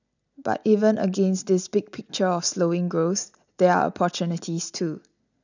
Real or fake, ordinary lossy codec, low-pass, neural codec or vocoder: real; none; 7.2 kHz; none